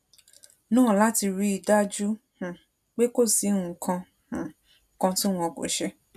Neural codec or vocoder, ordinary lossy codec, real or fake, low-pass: none; none; real; 14.4 kHz